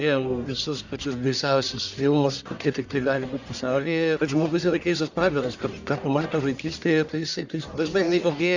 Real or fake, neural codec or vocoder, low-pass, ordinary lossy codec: fake; codec, 44.1 kHz, 1.7 kbps, Pupu-Codec; 7.2 kHz; Opus, 64 kbps